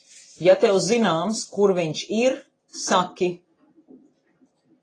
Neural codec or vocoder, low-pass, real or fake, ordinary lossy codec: none; 9.9 kHz; real; AAC, 32 kbps